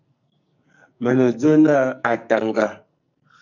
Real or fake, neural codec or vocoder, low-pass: fake; codec, 44.1 kHz, 2.6 kbps, SNAC; 7.2 kHz